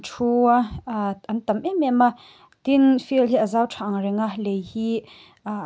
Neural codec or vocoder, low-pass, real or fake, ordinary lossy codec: none; none; real; none